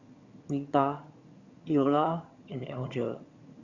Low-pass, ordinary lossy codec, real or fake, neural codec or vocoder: 7.2 kHz; Opus, 64 kbps; fake; vocoder, 22.05 kHz, 80 mel bands, HiFi-GAN